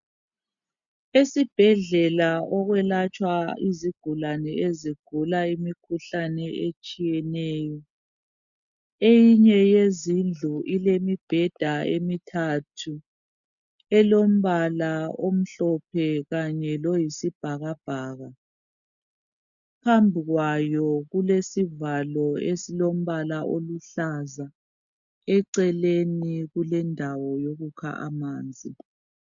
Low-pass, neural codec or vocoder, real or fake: 7.2 kHz; none; real